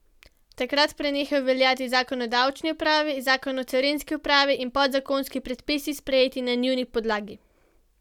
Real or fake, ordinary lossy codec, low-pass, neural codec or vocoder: real; none; 19.8 kHz; none